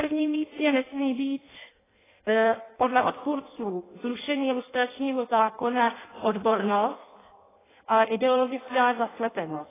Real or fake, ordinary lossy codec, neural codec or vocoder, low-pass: fake; AAC, 16 kbps; codec, 16 kHz in and 24 kHz out, 0.6 kbps, FireRedTTS-2 codec; 3.6 kHz